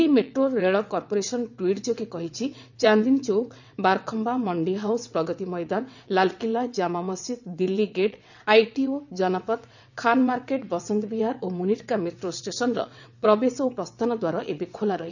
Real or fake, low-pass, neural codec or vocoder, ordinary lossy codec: fake; 7.2 kHz; vocoder, 22.05 kHz, 80 mel bands, WaveNeXt; none